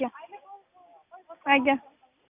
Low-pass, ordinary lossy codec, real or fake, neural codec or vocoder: 3.6 kHz; none; real; none